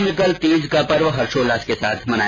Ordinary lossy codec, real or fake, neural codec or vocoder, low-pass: none; real; none; none